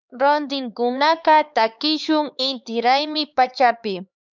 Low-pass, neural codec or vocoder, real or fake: 7.2 kHz; codec, 16 kHz, 4 kbps, X-Codec, HuBERT features, trained on LibriSpeech; fake